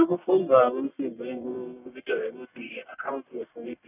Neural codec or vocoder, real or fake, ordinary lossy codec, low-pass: codec, 44.1 kHz, 1.7 kbps, Pupu-Codec; fake; none; 3.6 kHz